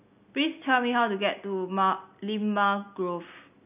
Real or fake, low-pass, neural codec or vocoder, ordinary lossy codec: real; 3.6 kHz; none; none